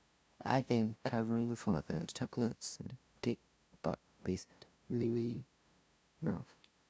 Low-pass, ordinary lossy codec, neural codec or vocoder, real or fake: none; none; codec, 16 kHz, 0.5 kbps, FunCodec, trained on LibriTTS, 25 frames a second; fake